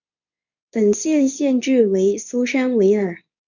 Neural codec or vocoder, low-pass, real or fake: codec, 24 kHz, 0.9 kbps, WavTokenizer, medium speech release version 2; 7.2 kHz; fake